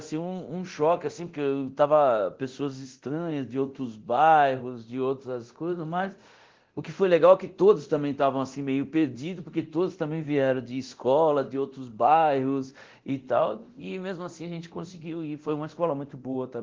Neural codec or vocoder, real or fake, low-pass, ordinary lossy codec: codec, 24 kHz, 0.9 kbps, DualCodec; fake; 7.2 kHz; Opus, 16 kbps